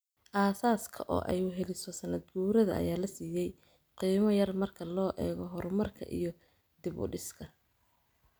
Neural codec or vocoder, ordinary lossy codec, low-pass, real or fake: none; none; none; real